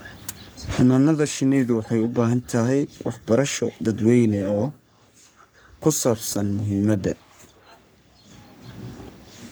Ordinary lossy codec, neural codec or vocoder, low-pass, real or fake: none; codec, 44.1 kHz, 3.4 kbps, Pupu-Codec; none; fake